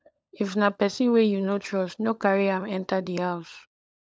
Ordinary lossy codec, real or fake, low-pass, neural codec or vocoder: none; fake; none; codec, 16 kHz, 16 kbps, FunCodec, trained on LibriTTS, 50 frames a second